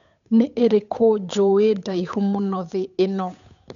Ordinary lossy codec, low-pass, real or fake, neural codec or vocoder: none; 7.2 kHz; fake; codec, 16 kHz, 8 kbps, FunCodec, trained on Chinese and English, 25 frames a second